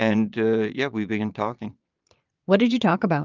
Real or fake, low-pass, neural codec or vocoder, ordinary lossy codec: fake; 7.2 kHz; vocoder, 22.05 kHz, 80 mel bands, Vocos; Opus, 24 kbps